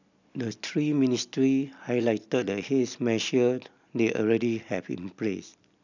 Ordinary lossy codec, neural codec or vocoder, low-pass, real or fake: none; none; 7.2 kHz; real